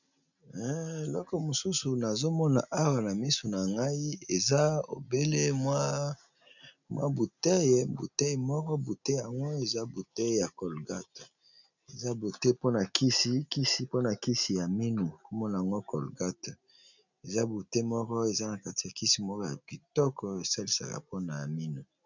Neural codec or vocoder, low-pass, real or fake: none; 7.2 kHz; real